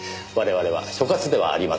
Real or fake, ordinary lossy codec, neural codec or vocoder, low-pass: real; none; none; none